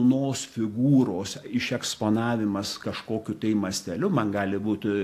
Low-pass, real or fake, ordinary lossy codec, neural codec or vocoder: 14.4 kHz; real; AAC, 64 kbps; none